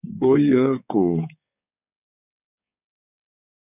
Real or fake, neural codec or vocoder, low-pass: fake; codec, 16 kHz, 4 kbps, X-Codec, HuBERT features, trained on balanced general audio; 3.6 kHz